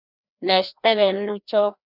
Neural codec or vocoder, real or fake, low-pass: codec, 16 kHz, 1 kbps, FreqCodec, larger model; fake; 5.4 kHz